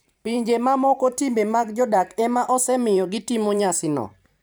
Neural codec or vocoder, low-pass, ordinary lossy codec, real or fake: vocoder, 44.1 kHz, 128 mel bands every 512 samples, BigVGAN v2; none; none; fake